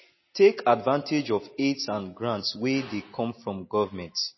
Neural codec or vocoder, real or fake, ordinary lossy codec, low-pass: none; real; MP3, 24 kbps; 7.2 kHz